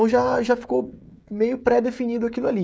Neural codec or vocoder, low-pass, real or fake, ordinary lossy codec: codec, 16 kHz, 16 kbps, FreqCodec, smaller model; none; fake; none